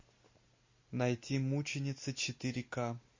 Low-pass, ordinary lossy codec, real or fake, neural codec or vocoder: 7.2 kHz; MP3, 32 kbps; real; none